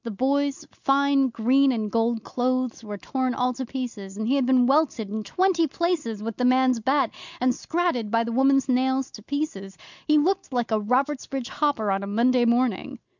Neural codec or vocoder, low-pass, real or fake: none; 7.2 kHz; real